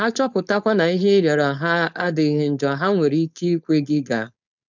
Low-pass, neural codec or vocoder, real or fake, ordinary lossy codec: 7.2 kHz; codec, 16 kHz, 4.8 kbps, FACodec; fake; none